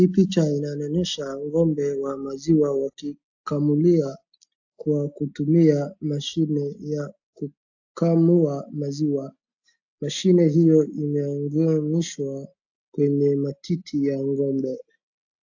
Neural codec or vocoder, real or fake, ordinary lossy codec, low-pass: none; real; AAC, 48 kbps; 7.2 kHz